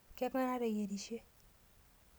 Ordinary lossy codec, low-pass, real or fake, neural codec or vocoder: none; none; real; none